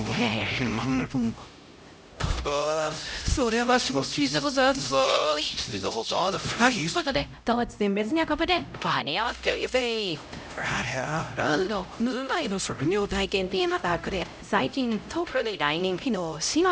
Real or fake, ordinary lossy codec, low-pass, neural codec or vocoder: fake; none; none; codec, 16 kHz, 0.5 kbps, X-Codec, HuBERT features, trained on LibriSpeech